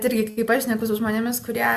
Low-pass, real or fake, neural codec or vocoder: 14.4 kHz; real; none